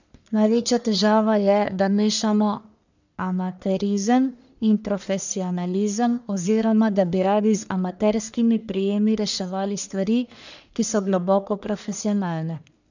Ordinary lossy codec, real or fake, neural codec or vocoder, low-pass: none; fake; codec, 44.1 kHz, 1.7 kbps, Pupu-Codec; 7.2 kHz